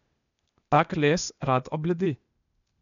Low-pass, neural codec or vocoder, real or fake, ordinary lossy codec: 7.2 kHz; codec, 16 kHz, 0.8 kbps, ZipCodec; fake; none